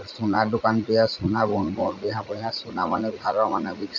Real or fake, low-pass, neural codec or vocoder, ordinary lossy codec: fake; 7.2 kHz; vocoder, 44.1 kHz, 80 mel bands, Vocos; none